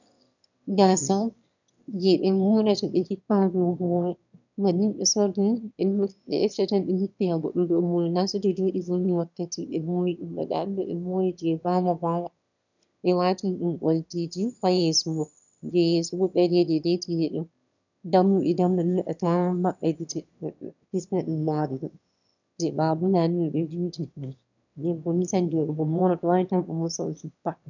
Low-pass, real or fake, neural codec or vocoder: 7.2 kHz; fake; autoencoder, 22.05 kHz, a latent of 192 numbers a frame, VITS, trained on one speaker